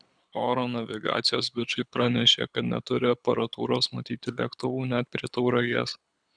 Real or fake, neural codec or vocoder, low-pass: fake; codec, 24 kHz, 6 kbps, HILCodec; 9.9 kHz